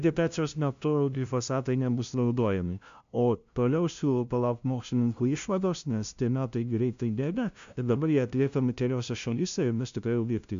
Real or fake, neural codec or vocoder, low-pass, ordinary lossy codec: fake; codec, 16 kHz, 0.5 kbps, FunCodec, trained on LibriTTS, 25 frames a second; 7.2 kHz; MP3, 64 kbps